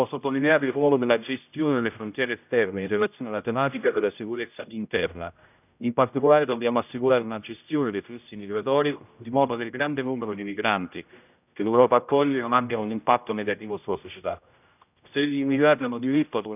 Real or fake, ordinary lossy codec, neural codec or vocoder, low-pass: fake; none; codec, 16 kHz, 0.5 kbps, X-Codec, HuBERT features, trained on general audio; 3.6 kHz